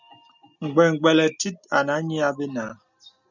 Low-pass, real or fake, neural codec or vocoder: 7.2 kHz; real; none